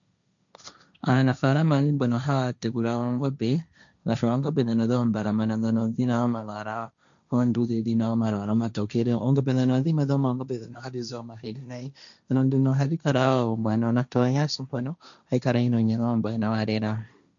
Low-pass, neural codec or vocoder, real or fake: 7.2 kHz; codec, 16 kHz, 1.1 kbps, Voila-Tokenizer; fake